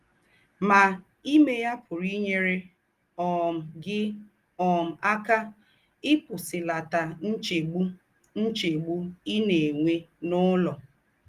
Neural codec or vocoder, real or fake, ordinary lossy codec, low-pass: none; real; Opus, 24 kbps; 14.4 kHz